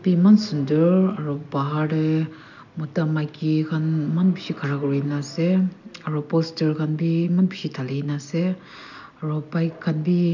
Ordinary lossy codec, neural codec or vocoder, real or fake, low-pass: none; none; real; 7.2 kHz